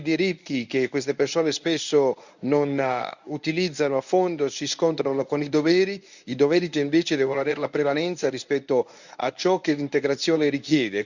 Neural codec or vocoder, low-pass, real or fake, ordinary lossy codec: codec, 24 kHz, 0.9 kbps, WavTokenizer, medium speech release version 1; 7.2 kHz; fake; none